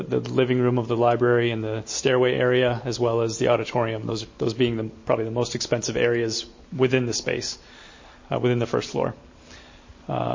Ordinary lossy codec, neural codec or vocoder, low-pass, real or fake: MP3, 32 kbps; none; 7.2 kHz; real